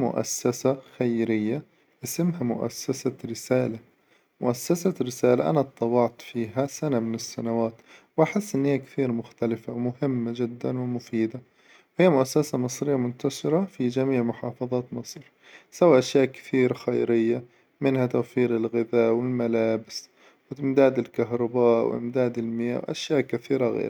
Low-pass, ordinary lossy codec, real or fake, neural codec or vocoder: none; none; real; none